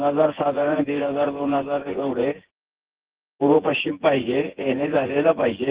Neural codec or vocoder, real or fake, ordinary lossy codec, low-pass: vocoder, 24 kHz, 100 mel bands, Vocos; fake; Opus, 16 kbps; 3.6 kHz